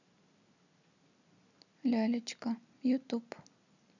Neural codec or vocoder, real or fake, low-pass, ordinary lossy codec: none; real; 7.2 kHz; none